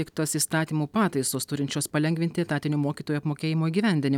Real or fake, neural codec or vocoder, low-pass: real; none; 19.8 kHz